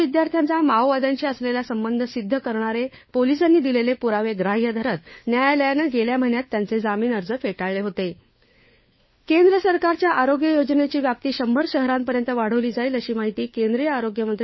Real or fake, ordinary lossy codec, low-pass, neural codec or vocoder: fake; MP3, 24 kbps; 7.2 kHz; codec, 16 kHz, 4 kbps, FunCodec, trained on LibriTTS, 50 frames a second